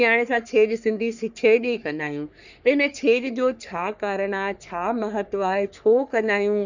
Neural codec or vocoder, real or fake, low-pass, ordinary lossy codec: codec, 44.1 kHz, 3.4 kbps, Pupu-Codec; fake; 7.2 kHz; none